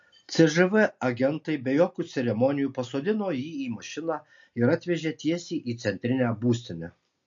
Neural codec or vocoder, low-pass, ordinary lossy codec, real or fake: none; 7.2 kHz; MP3, 48 kbps; real